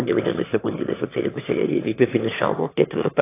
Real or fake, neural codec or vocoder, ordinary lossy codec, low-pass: fake; autoencoder, 22.05 kHz, a latent of 192 numbers a frame, VITS, trained on one speaker; AAC, 24 kbps; 3.6 kHz